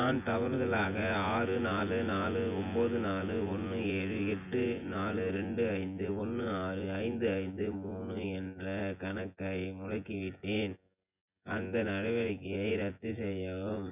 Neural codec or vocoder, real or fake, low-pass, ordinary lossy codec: vocoder, 24 kHz, 100 mel bands, Vocos; fake; 3.6 kHz; none